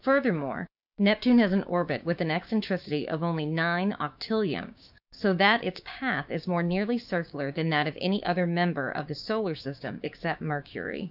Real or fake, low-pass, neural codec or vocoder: fake; 5.4 kHz; codec, 16 kHz, 6 kbps, DAC